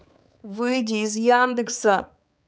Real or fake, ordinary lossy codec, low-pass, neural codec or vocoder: fake; none; none; codec, 16 kHz, 4 kbps, X-Codec, HuBERT features, trained on balanced general audio